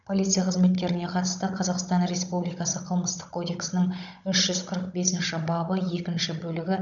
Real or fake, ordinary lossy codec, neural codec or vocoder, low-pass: fake; none; codec, 16 kHz, 16 kbps, FunCodec, trained on Chinese and English, 50 frames a second; 7.2 kHz